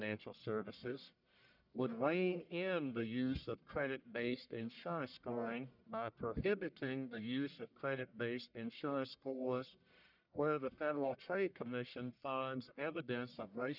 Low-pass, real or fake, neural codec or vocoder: 5.4 kHz; fake; codec, 44.1 kHz, 1.7 kbps, Pupu-Codec